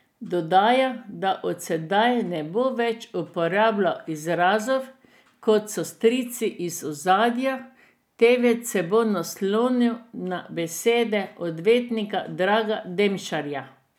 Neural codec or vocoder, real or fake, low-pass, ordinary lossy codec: none; real; 19.8 kHz; none